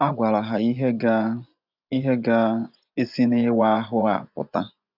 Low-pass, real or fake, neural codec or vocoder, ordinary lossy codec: 5.4 kHz; fake; vocoder, 24 kHz, 100 mel bands, Vocos; none